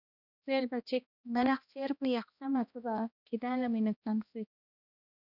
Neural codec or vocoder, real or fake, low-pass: codec, 16 kHz, 1 kbps, X-Codec, HuBERT features, trained on balanced general audio; fake; 5.4 kHz